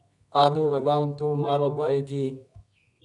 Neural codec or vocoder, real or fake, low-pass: codec, 24 kHz, 0.9 kbps, WavTokenizer, medium music audio release; fake; 10.8 kHz